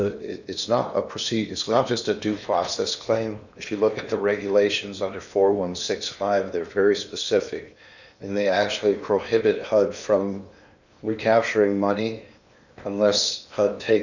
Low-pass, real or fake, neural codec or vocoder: 7.2 kHz; fake; codec, 16 kHz in and 24 kHz out, 0.8 kbps, FocalCodec, streaming, 65536 codes